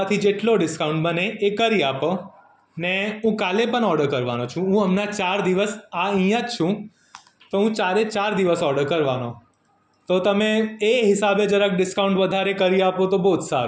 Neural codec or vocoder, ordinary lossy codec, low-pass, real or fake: none; none; none; real